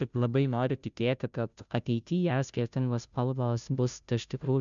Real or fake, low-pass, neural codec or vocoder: fake; 7.2 kHz; codec, 16 kHz, 0.5 kbps, FunCodec, trained on Chinese and English, 25 frames a second